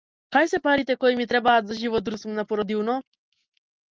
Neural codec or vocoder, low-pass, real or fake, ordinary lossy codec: none; 7.2 kHz; real; Opus, 24 kbps